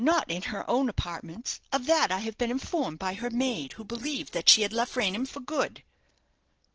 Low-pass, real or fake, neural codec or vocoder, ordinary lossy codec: 7.2 kHz; real; none; Opus, 16 kbps